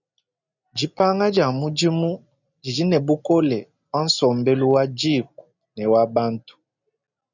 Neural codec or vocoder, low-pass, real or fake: none; 7.2 kHz; real